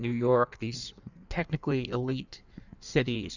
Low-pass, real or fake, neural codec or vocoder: 7.2 kHz; fake; codec, 16 kHz in and 24 kHz out, 1.1 kbps, FireRedTTS-2 codec